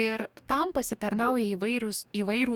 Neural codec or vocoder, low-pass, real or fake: codec, 44.1 kHz, 2.6 kbps, DAC; 19.8 kHz; fake